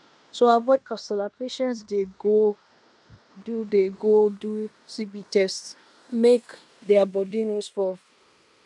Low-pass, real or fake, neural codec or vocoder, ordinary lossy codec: 10.8 kHz; fake; codec, 16 kHz in and 24 kHz out, 0.9 kbps, LongCat-Audio-Codec, fine tuned four codebook decoder; none